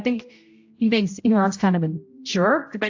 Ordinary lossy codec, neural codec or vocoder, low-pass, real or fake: AAC, 48 kbps; codec, 16 kHz, 0.5 kbps, X-Codec, HuBERT features, trained on general audio; 7.2 kHz; fake